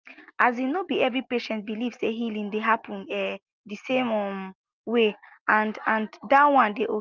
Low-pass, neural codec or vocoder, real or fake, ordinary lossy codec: 7.2 kHz; none; real; Opus, 24 kbps